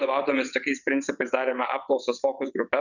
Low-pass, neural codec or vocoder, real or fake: 7.2 kHz; vocoder, 22.05 kHz, 80 mel bands, WaveNeXt; fake